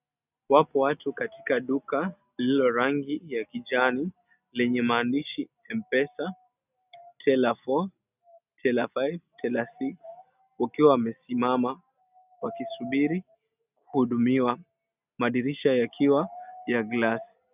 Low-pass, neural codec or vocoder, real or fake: 3.6 kHz; none; real